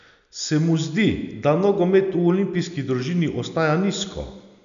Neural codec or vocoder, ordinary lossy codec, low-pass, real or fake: none; none; 7.2 kHz; real